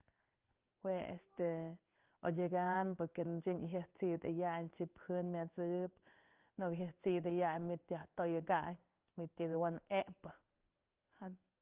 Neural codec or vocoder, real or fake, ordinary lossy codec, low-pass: codec, 16 kHz in and 24 kHz out, 1 kbps, XY-Tokenizer; fake; Opus, 32 kbps; 3.6 kHz